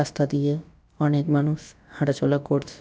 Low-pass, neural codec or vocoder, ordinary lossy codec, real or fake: none; codec, 16 kHz, about 1 kbps, DyCAST, with the encoder's durations; none; fake